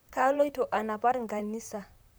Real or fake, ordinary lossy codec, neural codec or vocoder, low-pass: fake; none; vocoder, 44.1 kHz, 128 mel bands, Pupu-Vocoder; none